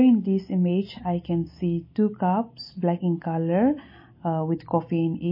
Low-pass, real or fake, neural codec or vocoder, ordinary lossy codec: 5.4 kHz; real; none; MP3, 24 kbps